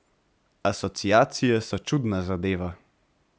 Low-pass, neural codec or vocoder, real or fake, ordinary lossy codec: none; none; real; none